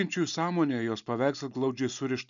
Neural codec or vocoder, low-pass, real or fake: none; 7.2 kHz; real